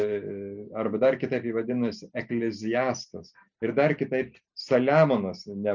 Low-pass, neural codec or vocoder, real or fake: 7.2 kHz; none; real